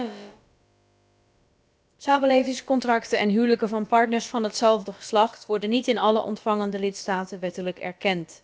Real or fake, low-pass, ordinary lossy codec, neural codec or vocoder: fake; none; none; codec, 16 kHz, about 1 kbps, DyCAST, with the encoder's durations